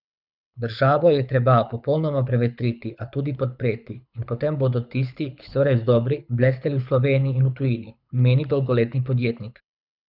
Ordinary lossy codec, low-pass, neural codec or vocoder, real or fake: none; 5.4 kHz; codec, 24 kHz, 6 kbps, HILCodec; fake